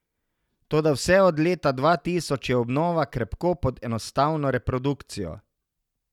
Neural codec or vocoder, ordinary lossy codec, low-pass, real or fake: none; none; 19.8 kHz; real